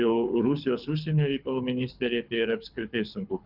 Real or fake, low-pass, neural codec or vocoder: fake; 5.4 kHz; codec, 24 kHz, 6 kbps, HILCodec